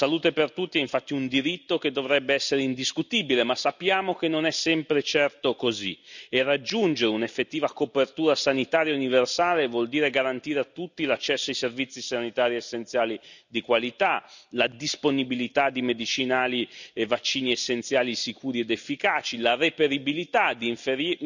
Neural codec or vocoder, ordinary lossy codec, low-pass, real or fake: none; none; 7.2 kHz; real